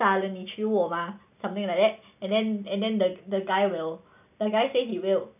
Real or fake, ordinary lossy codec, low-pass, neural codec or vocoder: real; none; 3.6 kHz; none